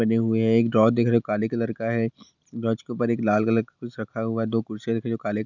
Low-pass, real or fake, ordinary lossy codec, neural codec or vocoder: 7.2 kHz; real; none; none